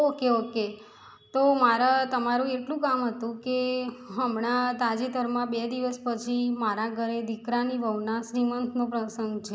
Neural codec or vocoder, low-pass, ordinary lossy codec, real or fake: none; none; none; real